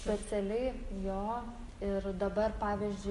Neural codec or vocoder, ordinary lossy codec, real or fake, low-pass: none; MP3, 48 kbps; real; 14.4 kHz